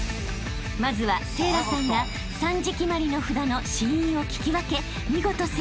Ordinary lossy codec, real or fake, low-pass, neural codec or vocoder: none; real; none; none